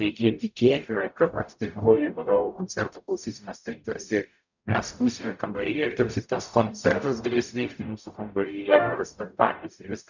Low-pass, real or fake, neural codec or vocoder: 7.2 kHz; fake; codec, 44.1 kHz, 0.9 kbps, DAC